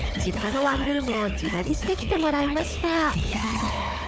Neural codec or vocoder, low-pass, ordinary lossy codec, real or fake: codec, 16 kHz, 4 kbps, FunCodec, trained on Chinese and English, 50 frames a second; none; none; fake